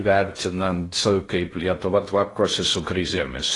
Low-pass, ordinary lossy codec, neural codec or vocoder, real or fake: 10.8 kHz; AAC, 32 kbps; codec, 16 kHz in and 24 kHz out, 0.6 kbps, FocalCodec, streaming, 2048 codes; fake